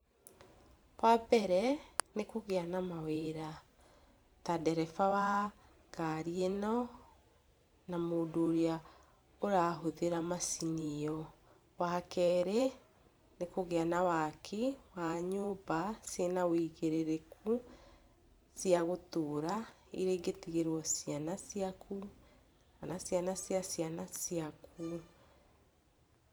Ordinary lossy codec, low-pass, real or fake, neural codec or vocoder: none; none; fake; vocoder, 44.1 kHz, 128 mel bands every 512 samples, BigVGAN v2